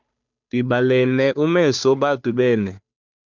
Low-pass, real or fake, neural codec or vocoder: 7.2 kHz; fake; codec, 16 kHz, 2 kbps, FunCodec, trained on Chinese and English, 25 frames a second